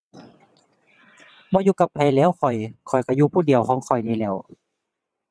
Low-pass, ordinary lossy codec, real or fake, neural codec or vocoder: none; none; fake; vocoder, 22.05 kHz, 80 mel bands, WaveNeXt